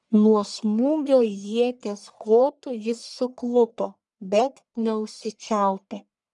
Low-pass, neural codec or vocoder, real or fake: 10.8 kHz; codec, 44.1 kHz, 1.7 kbps, Pupu-Codec; fake